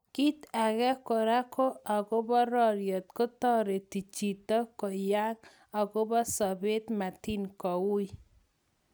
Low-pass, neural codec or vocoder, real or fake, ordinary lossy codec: none; none; real; none